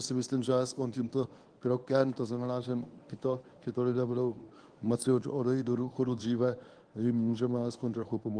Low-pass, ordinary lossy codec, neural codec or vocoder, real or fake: 9.9 kHz; Opus, 24 kbps; codec, 24 kHz, 0.9 kbps, WavTokenizer, medium speech release version 1; fake